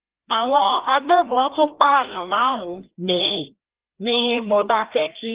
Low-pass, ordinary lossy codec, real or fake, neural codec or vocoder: 3.6 kHz; Opus, 16 kbps; fake; codec, 16 kHz, 1 kbps, FreqCodec, larger model